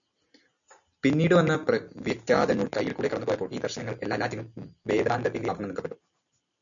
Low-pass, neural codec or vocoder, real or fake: 7.2 kHz; none; real